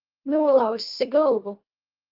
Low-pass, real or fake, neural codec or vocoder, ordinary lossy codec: 5.4 kHz; fake; codec, 24 kHz, 1.5 kbps, HILCodec; Opus, 32 kbps